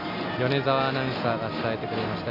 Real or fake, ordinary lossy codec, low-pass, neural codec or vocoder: real; none; 5.4 kHz; none